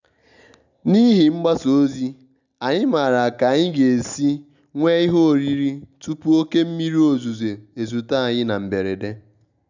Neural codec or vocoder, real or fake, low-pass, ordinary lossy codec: none; real; 7.2 kHz; none